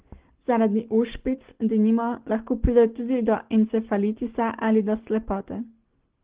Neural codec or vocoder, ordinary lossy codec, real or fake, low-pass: none; Opus, 16 kbps; real; 3.6 kHz